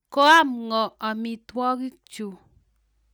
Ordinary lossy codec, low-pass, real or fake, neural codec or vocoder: none; none; real; none